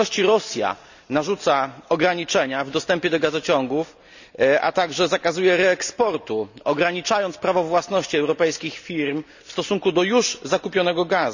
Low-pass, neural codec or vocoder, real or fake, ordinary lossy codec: 7.2 kHz; none; real; none